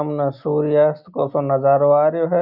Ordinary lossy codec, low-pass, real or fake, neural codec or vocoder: none; 5.4 kHz; real; none